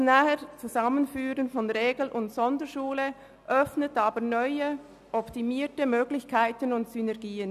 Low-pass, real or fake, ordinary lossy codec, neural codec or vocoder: 14.4 kHz; real; none; none